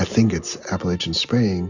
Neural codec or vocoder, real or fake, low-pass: none; real; 7.2 kHz